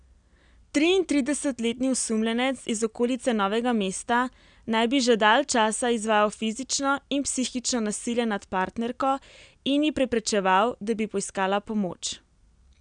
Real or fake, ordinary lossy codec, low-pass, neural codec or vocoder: real; none; 9.9 kHz; none